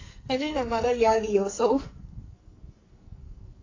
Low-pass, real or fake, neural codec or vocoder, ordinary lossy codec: 7.2 kHz; fake; codec, 44.1 kHz, 2.6 kbps, SNAC; AAC, 32 kbps